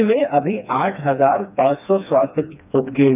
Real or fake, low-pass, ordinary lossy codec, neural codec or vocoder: fake; 3.6 kHz; none; codec, 16 kHz, 2 kbps, FreqCodec, smaller model